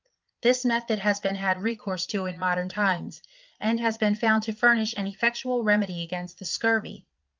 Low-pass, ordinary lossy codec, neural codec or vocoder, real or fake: 7.2 kHz; Opus, 24 kbps; vocoder, 22.05 kHz, 80 mel bands, Vocos; fake